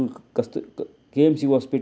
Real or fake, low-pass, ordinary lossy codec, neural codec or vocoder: real; none; none; none